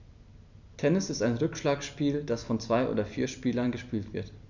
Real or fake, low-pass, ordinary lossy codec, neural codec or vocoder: real; 7.2 kHz; none; none